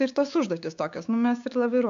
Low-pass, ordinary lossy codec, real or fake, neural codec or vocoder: 7.2 kHz; AAC, 64 kbps; fake; codec, 16 kHz, 6 kbps, DAC